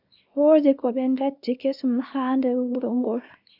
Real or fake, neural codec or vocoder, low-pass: fake; codec, 24 kHz, 0.9 kbps, WavTokenizer, small release; 5.4 kHz